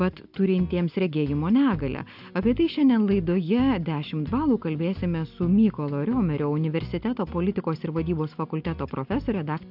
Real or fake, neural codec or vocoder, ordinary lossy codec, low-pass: real; none; AAC, 48 kbps; 5.4 kHz